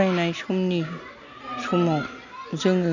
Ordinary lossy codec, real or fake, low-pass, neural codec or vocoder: none; real; 7.2 kHz; none